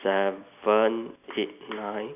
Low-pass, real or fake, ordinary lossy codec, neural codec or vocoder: 3.6 kHz; real; none; none